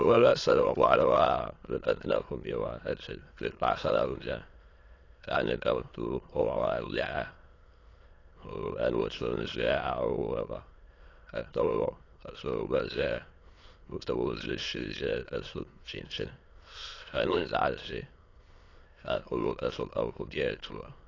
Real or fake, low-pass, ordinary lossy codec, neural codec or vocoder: fake; 7.2 kHz; AAC, 32 kbps; autoencoder, 22.05 kHz, a latent of 192 numbers a frame, VITS, trained on many speakers